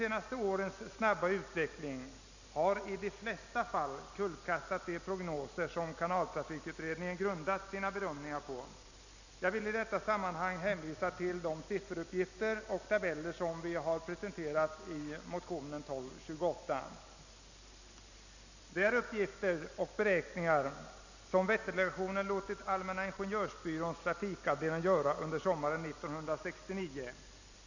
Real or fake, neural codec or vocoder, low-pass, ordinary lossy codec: real; none; 7.2 kHz; none